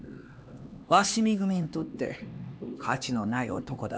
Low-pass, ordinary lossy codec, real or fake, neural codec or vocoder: none; none; fake; codec, 16 kHz, 2 kbps, X-Codec, HuBERT features, trained on LibriSpeech